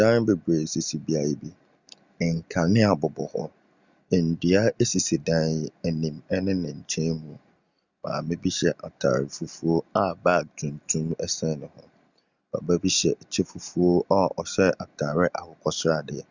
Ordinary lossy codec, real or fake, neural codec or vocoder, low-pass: Opus, 64 kbps; real; none; 7.2 kHz